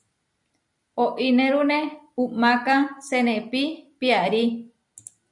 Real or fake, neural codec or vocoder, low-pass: real; none; 10.8 kHz